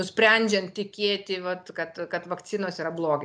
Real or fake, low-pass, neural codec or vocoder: real; 9.9 kHz; none